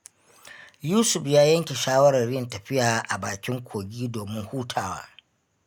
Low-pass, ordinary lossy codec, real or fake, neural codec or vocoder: 19.8 kHz; none; real; none